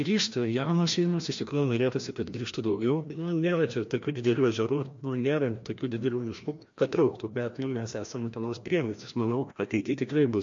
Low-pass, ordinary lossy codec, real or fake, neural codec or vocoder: 7.2 kHz; MP3, 48 kbps; fake; codec, 16 kHz, 1 kbps, FreqCodec, larger model